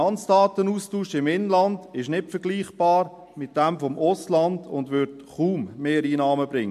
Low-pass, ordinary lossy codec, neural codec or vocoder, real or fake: 14.4 kHz; MP3, 64 kbps; none; real